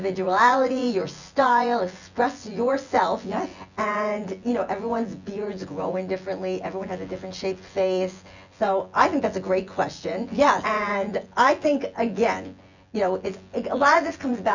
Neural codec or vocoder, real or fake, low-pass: vocoder, 24 kHz, 100 mel bands, Vocos; fake; 7.2 kHz